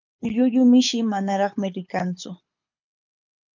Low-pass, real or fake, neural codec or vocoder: 7.2 kHz; fake; codec, 24 kHz, 6 kbps, HILCodec